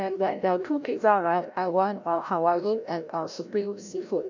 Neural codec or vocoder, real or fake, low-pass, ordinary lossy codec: codec, 16 kHz, 0.5 kbps, FreqCodec, larger model; fake; 7.2 kHz; none